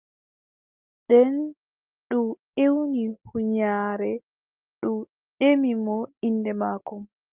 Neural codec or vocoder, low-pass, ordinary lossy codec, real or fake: none; 3.6 kHz; Opus, 32 kbps; real